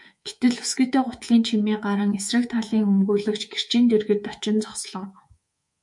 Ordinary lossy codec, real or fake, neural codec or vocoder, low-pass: MP3, 64 kbps; fake; codec, 24 kHz, 3.1 kbps, DualCodec; 10.8 kHz